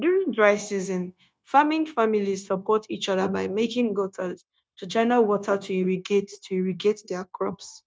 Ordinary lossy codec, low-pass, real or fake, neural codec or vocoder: none; none; fake; codec, 16 kHz, 0.9 kbps, LongCat-Audio-Codec